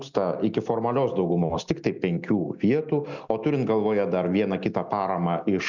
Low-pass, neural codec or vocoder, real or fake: 7.2 kHz; none; real